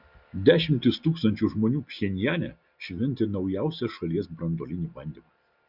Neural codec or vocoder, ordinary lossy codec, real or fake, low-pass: none; Opus, 64 kbps; real; 5.4 kHz